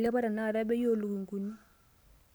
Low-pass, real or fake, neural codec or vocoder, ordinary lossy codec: none; real; none; none